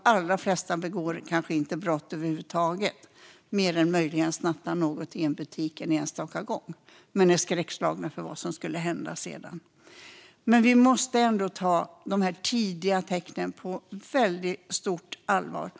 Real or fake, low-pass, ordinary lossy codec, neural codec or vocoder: real; none; none; none